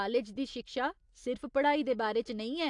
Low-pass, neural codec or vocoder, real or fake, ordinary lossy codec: 10.8 kHz; none; real; AAC, 64 kbps